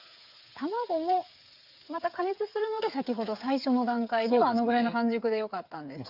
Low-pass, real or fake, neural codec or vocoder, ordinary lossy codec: 5.4 kHz; fake; codec, 16 kHz, 8 kbps, FreqCodec, smaller model; Opus, 64 kbps